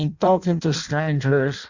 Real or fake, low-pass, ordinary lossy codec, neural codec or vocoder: fake; 7.2 kHz; Opus, 64 kbps; codec, 16 kHz in and 24 kHz out, 0.6 kbps, FireRedTTS-2 codec